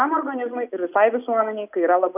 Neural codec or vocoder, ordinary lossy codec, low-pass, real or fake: none; AAC, 32 kbps; 3.6 kHz; real